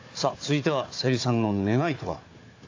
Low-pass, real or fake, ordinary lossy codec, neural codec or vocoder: 7.2 kHz; fake; AAC, 48 kbps; codec, 16 kHz, 4 kbps, FunCodec, trained on Chinese and English, 50 frames a second